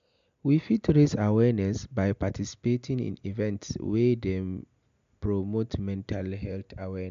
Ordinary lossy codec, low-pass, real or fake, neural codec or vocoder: MP3, 64 kbps; 7.2 kHz; real; none